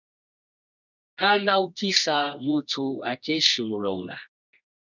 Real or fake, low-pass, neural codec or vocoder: fake; 7.2 kHz; codec, 24 kHz, 0.9 kbps, WavTokenizer, medium music audio release